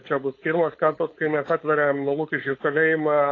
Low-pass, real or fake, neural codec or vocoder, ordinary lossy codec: 7.2 kHz; fake; codec, 16 kHz, 4.8 kbps, FACodec; AAC, 32 kbps